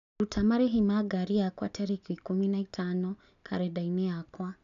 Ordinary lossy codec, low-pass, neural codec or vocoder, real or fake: none; 7.2 kHz; none; real